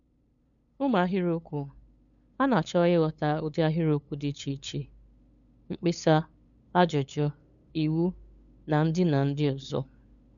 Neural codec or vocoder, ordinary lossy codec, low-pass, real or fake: codec, 16 kHz, 8 kbps, FunCodec, trained on LibriTTS, 25 frames a second; none; 7.2 kHz; fake